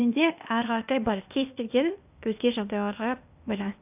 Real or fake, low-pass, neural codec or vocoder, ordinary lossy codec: fake; 3.6 kHz; codec, 16 kHz, 0.8 kbps, ZipCodec; none